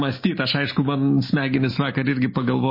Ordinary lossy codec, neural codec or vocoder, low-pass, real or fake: MP3, 24 kbps; none; 5.4 kHz; real